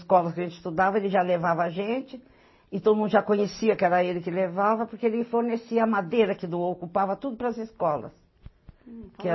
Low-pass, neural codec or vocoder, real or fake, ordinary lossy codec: 7.2 kHz; none; real; MP3, 24 kbps